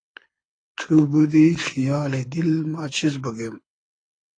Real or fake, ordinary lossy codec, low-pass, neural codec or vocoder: fake; AAC, 32 kbps; 9.9 kHz; codec, 24 kHz, 6 kbps, HILCodec